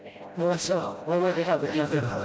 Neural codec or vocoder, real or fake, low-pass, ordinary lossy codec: codec, 16 kHz, 0.5 kbps, FreqCodec, smaller model; fake; none; none